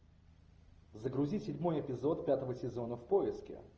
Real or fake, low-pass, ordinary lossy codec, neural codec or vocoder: real; 7.2 kHz; Opus, 24 kbps; none